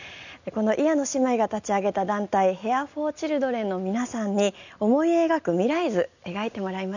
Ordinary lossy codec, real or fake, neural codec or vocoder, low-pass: none; real; none; 7.2 kHz